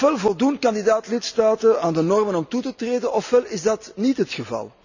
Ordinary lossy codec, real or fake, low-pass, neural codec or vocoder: none; real; 7.2 kHz; none